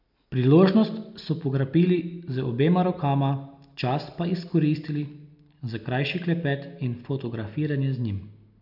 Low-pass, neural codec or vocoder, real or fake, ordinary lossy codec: 5.4 kHz; none; real; none